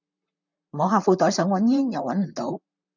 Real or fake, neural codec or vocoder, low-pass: fake; vocoder, 44.1 kHz, 128 mel bands, Pupu-Vocoder; 7.2 kHz